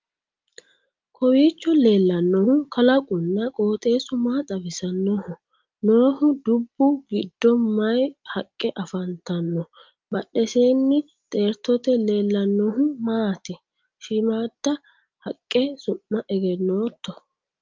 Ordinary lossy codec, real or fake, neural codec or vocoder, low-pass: Opus, 24 kbps; real; none; 7.2 kHz